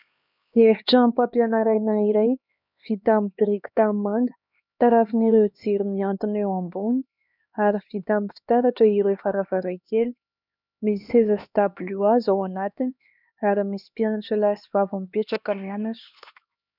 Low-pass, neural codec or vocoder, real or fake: 5.4 kHz; codec, 16 kHz, 2 kbps, X-Codec, HuBERT features, trained on LibriSpeech; fake